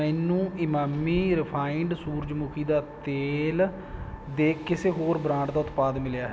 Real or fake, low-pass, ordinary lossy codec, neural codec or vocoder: real; none; none; none